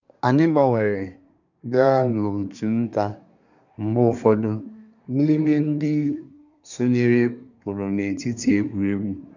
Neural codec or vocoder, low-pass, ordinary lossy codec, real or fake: codec, 24 kHz, 1 kbps, SNAC; 7.2 kHz; none; fake